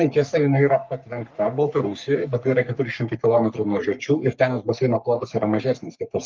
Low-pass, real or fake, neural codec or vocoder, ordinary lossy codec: 7.2 kHz; fake; codec, 44.1 kHz, 3.4 kbps, Pupu-Codec; Opus, 24 kbps